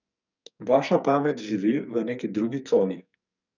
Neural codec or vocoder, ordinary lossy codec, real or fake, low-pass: codec, 44.1 kHz, 2.6 kbps, SNAC; none; fake; 7.2 kHz